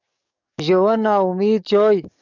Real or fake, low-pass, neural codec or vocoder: fake; 7.2 kHz; codec, 44.1 kHz, 7.8 kbps, DAC